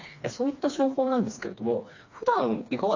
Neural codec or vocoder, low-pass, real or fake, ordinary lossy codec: codec, 16 kHz, 2 kbps, FreqCodec, smaller model; 7.2 kHz; fake; AAC, 48 kbps